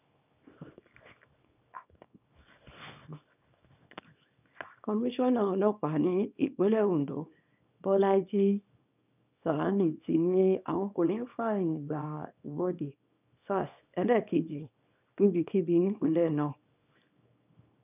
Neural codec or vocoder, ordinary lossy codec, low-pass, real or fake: codec, 24 kHz, 0.9 kbps, WavTokenizer, small release; none; 3.6 kHz; fake